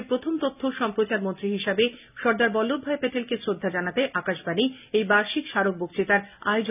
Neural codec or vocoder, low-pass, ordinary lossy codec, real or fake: none; 3.6 kHz; none; real